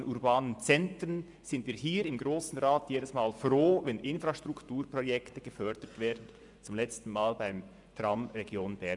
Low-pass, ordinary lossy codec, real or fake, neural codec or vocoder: 10.8 kHz; none; fake; vocoder, 24 kHz, 100 mel bands, Vocos